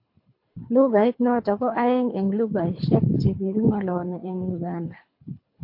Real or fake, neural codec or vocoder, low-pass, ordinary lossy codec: fake; codec, 24 kHz, 3 kbps, HILCodec; 5.4 kHz; MP3, 32 kbps